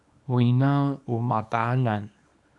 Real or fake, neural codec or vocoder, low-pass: fake; codec, 24 kHz, 0.9 kbps, WavTokenizer, small release; 10.8 kHz